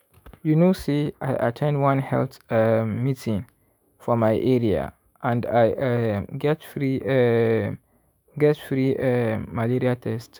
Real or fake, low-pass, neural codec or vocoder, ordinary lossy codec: fake; none; autoencoder, 48 kHz, 128 numbers a frame, DAC-VAE, trained on Japanese speech; none